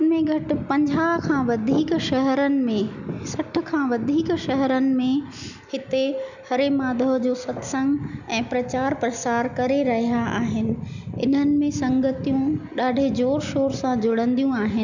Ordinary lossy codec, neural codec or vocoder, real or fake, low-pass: none; none; real; 7.2 kHz